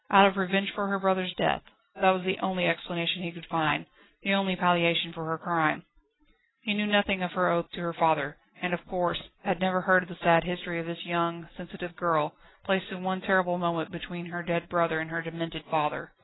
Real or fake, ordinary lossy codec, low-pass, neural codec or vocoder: real; AAC, 16 kbps; 7.2 kHz; none